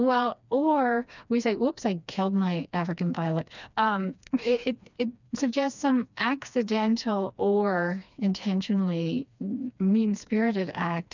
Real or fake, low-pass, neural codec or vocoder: fake; 7.2 kHz; codec, 16 kHz, 2 kbps, FreqCodec, smaller model